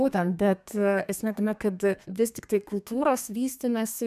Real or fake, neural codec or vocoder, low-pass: fake; codec, 32 kHz, 1.9 kbps, SNAC; 14.4 kHz